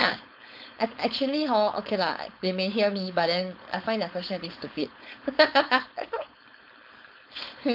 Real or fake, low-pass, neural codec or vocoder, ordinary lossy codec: fake; 5.4 kHz; codec, 16 kHz, 4.8 kbps, FACodec; none